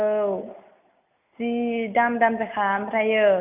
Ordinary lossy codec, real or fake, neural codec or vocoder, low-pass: none; real; none; 3.6 kHz